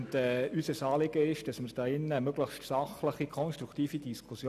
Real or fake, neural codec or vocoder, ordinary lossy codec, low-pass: real; none; none; 14.4 kHz